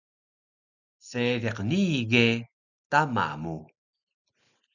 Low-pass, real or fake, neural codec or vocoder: 7.2 kHz; real; none